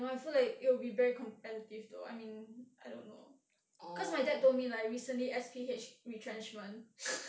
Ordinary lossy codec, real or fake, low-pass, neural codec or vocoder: none; real; none; none